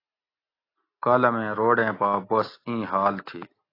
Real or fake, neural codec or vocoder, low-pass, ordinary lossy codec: real; none; 5.4 kHz; AAC, 24 kbps